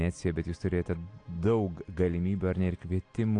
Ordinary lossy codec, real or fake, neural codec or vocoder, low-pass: AAC, 48 kbps; real; none; 9.9 kHz